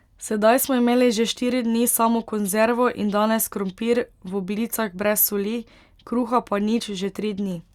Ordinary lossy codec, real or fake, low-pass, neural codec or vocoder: Opus, 64 kbps; real; 19.8 kHz; none